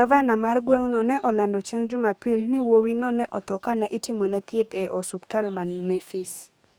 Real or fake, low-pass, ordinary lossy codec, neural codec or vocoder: fake; none; none; codec, 44.1 kHz, 2.6 kbps, DAC